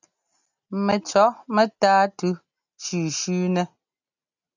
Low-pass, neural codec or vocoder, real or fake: 7.2 kHz; none; real